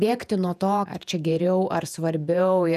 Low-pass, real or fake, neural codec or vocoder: 14.4 kHz; fake; vocoder, 48 kHz, 128 mel bands, Vocos